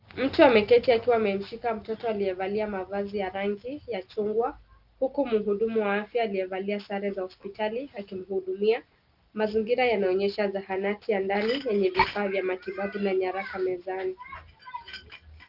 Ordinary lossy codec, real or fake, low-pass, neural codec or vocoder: Opus, 24 kbps; real; 5.4 kHz; none